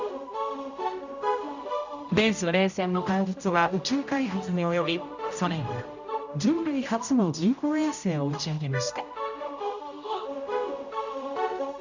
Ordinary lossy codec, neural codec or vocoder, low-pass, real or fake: none; codec, 16 kHz, 0.5 kbps, X-Codec, HuBERT features, trained on general audio; 7.2 kHz; fake